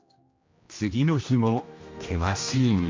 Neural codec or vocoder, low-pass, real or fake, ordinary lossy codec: codec, 16 kHz, 1 kbps, X-Codec, HuBERT features, trained on general audio; 7.2 kHz; fake; MP3, 48 kbps